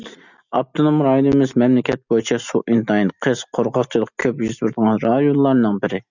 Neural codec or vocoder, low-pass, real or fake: none; 7.2 kHz; real